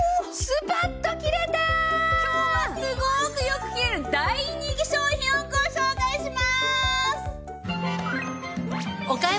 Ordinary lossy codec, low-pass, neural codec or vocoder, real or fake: none; none; none; real